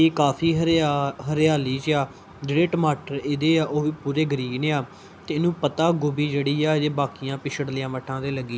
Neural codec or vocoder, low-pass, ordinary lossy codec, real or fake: none; none; none; real